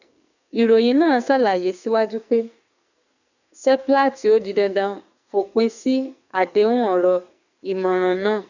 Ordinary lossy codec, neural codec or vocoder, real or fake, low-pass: none; codec, 44.1 kHz, 2.6 kbps, SNAC; fake; 7.2 kHz